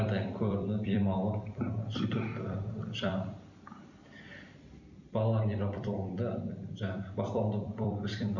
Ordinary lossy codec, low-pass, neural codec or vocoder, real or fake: none; 7.2 kHz; vocoder, 44.1 kHz, 128 mel bands every 256 samples, BigVGAN v2; fake